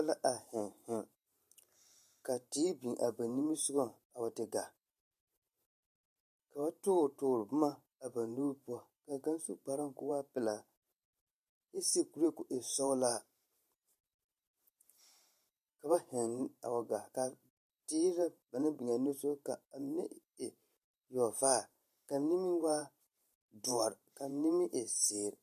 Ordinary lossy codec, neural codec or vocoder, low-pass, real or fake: MP3, 64 kbps; vocoder, 44.1 kHz, 128 mel bands every 512 samples, BigVGAN v2; 14.4 kHz; fake